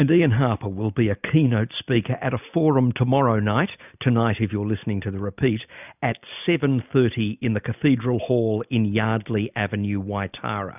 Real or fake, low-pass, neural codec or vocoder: real; 3.6 kHz; none